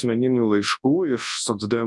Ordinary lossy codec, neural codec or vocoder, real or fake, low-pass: MP3, 96 kbps; codec, 24 kHz, 0.9 kbps, WavTokenizer, large speech release; fake; 10.8 kHz